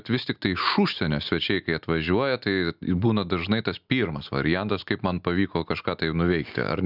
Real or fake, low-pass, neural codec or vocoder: real; 5.4 kHz; none